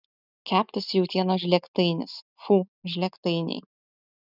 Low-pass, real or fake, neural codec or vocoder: 5.4 kHz; real; none